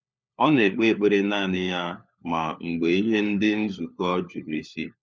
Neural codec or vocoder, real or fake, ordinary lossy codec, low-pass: codec, 16 kHz, 4 kbps, FunCodec, trained on LibriTTS, 50 frames a second; fake; none; none